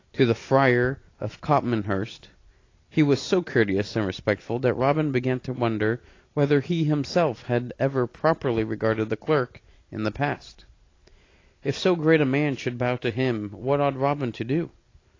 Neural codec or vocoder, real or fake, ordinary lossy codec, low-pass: none; real; AAC, 32 kbps; 7.2 kHz